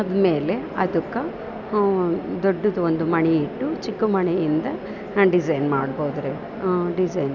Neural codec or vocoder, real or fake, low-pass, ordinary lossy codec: none; real; 7.2 kHz; none